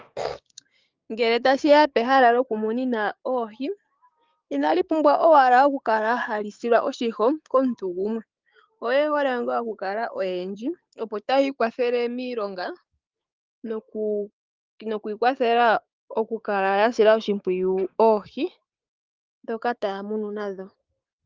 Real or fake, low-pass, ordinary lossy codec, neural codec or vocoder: fake; 7.2 kHz; Opus, 32 kbps; codec, 16 kHz, 6 kbps, DAC